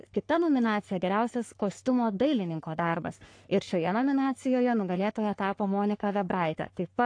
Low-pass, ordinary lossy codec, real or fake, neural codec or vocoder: 9.9 kHz; AAC, 48 kbps; fake; codec, 44.1 kHz, 3.4 kbps, Pupu-Codec